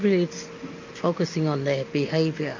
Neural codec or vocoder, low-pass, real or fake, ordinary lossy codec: none; 7.2 kHz; real; MP3, 48 kbps